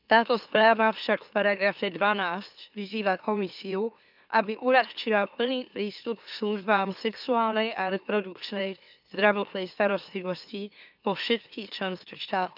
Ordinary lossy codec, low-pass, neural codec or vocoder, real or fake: none; 5.4 kHz; autoencoder, 44.1 kHz, a latent of 192 numbers a frame, MeloTTS; fake